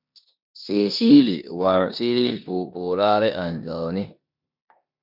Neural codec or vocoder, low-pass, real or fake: codec, 16 kHz in and 24 kHz out, 0.9 kbps, LongCat-Audio-Codec, four codebook decoder; 5.4 kHz; fake